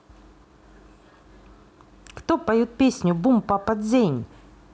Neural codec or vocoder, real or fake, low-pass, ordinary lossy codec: none; real; none; none